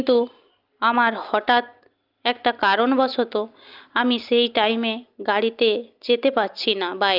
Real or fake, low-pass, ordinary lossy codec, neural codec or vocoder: real; 5.4 kHz; Opus, 24 kbps; none